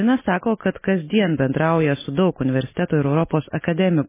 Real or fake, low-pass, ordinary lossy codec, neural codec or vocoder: real; 3.6 kHz; MP3, 16 kbps; none